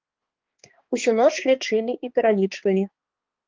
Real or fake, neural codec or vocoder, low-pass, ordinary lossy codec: fake; codec, 16 kHz, 2 kbps, X-Codec, HuBERT features, trained on balanced general audio; 7.2 kHz; Opus, 16 kbps